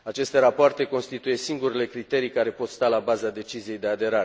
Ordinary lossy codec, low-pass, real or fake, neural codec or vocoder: none; none; real; none